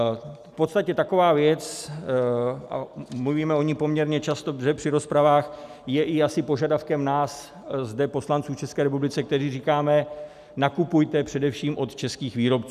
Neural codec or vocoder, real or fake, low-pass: none; real; 14.4 kHz